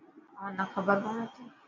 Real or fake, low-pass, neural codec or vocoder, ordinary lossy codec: real; 7.2 kHz; none; MP3, 64 kbps